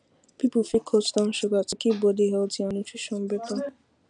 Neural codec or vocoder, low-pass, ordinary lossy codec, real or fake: none; 9.9 kHz; none; real